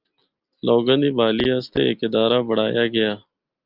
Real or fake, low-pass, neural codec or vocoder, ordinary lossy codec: real; 5.4 kHz; none; Opus, 24 kbps